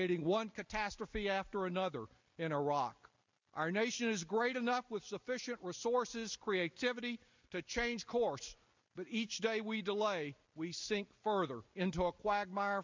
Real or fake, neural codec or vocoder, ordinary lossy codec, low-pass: real; none; MP3, 64 kbps; 7.2 kHz